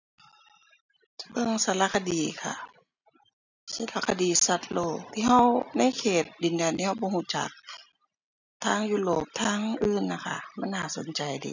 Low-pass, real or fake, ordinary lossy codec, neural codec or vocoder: 7.2 kHz; real; none; none